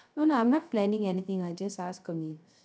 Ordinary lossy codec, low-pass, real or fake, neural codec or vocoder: none; none; fake; codec, 16 kHz, 0.3 kbps, FocalCodec